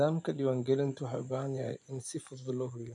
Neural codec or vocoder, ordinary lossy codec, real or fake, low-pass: vocoder, 24 kHz, 100 mel bands, Vocos; none; fake; 10.8 kHz